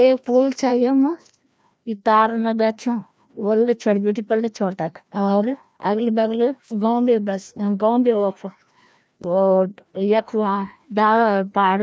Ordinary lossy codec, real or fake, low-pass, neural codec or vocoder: none; fake; none; codec, 16 kHz, 1 kbps, FreqCodec, larger model